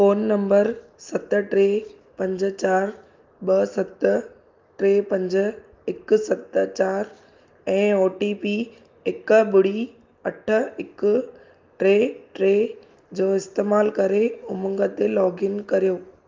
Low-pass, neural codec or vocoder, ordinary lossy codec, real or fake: 7.2 kHz; none; Opus, 24 kbps; real